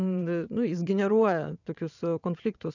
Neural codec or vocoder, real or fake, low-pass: vocoder, 22.05 kHz, 80 mel bands, WaveNeXt; fake; 7.2 kHz